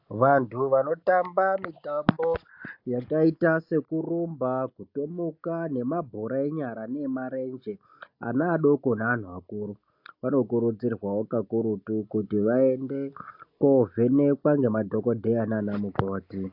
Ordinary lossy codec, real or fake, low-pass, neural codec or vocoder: AAC, 48 kbps; real; 5.4 kHz; none